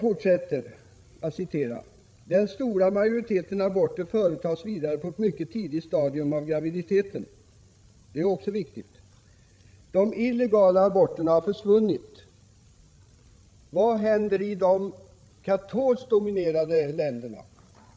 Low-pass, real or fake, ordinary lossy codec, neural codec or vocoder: none; fake; none; codec, 16 kHz, 16 kbps, FreqCodec, larger model